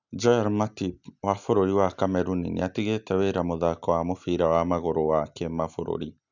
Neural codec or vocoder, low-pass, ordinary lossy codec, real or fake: none; 7.2 kHz; none; real